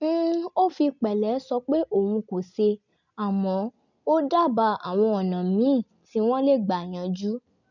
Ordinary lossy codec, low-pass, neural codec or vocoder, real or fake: none; 7.2 kHz; none; real